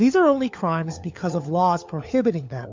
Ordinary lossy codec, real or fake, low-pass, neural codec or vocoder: MP3, 64 kbps; fake; 7.2 kHz; codec, 16 kHz, 4 kbps, FunCodec, trained on LibriTTS, 50 frames a second